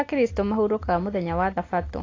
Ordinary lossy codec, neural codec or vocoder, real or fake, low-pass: AAC, 32 kbps; none; real; 7.2 kHz